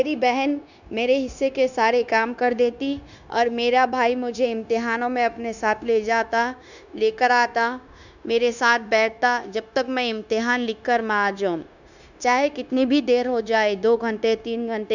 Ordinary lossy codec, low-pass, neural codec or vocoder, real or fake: none; 7.2 kHz; codec, 16 kHz, 0.9 kbps, LongCat-Audio-Codec; fake